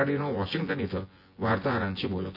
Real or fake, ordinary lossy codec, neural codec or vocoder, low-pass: fake; AAC, 32 kbps; vocoder, 24 kHz, 100 mel bands, Vocos; 5.4 kHz